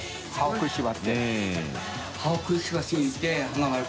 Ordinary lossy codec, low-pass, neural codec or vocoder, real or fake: none; none; none; real